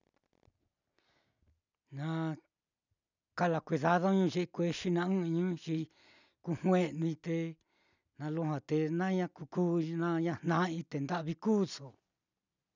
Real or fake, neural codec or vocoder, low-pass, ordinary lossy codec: real; none; 7.2 kHz; none